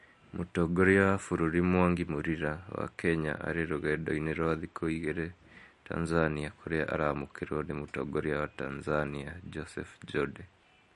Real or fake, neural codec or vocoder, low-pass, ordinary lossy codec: real; none; 14.4 kHz; MP3, 48 kbps